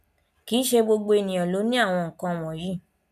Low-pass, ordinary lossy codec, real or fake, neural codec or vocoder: 14.4 kHz; none; real; none